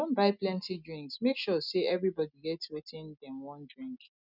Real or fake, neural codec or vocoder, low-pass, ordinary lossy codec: real; none; 5.4 kHz; none